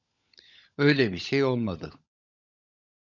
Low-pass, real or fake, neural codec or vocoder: 7.2 kHz; fake; codec, 16 kHz, 16 kbps, FunCodec, trained on LibriTTS, 50 frames a second